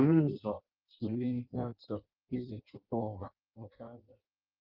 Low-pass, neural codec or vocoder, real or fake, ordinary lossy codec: 5.4 kHz; codec, 16 kHz in and 24 kHz out, 0.6 kbps, FireRedTTS-2 codec; fake; Opus, 24 kbps